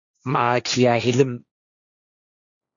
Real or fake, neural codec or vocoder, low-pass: fake; codec, 16 kHz, 1.1 kbps, Voila-Tokenizer; 7.2 kHz